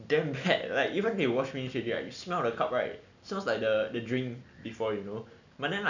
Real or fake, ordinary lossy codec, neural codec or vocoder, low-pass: real; none; none; 7.2 kHz